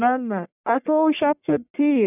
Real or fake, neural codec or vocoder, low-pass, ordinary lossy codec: fake; codec, 44.1 kHz, 1.7 kbps, Pupu-Codec; 3.6 kHz; none